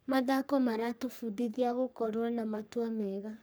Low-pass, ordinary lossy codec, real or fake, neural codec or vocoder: none; none; fake; codec, 44.1 kHz, 3.4 kbps, Pupu-Codec